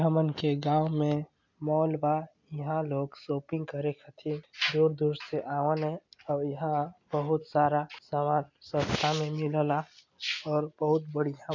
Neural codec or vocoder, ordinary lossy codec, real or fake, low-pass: none; MP3, 64 kbps; real; 7.2 kHz